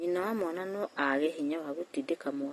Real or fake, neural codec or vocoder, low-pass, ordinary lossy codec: real; none; 19.8 kHz; AAC, 32 kbps